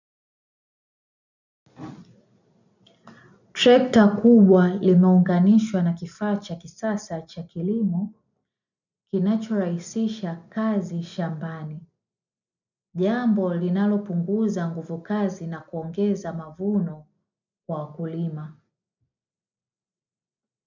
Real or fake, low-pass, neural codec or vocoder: real; 7.2 kHz; none